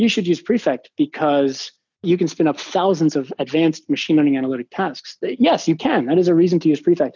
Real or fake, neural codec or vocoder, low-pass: real; none; 7.2 kHz